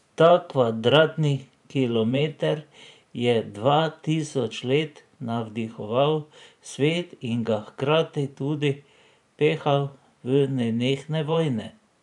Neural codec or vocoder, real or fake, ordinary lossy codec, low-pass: vocoder, 44.1 kHz, 128 mel bands every 512 samples, BigVGAN v2; fake; none; 10.8 kHz